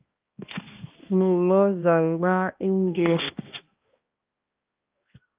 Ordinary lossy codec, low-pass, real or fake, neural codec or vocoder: Opus, 24 kbps; 3.6 kHz; fake; codec, 16 kHz, 1 kbps, X-Codec, HuBERT features, trained on balanced general audio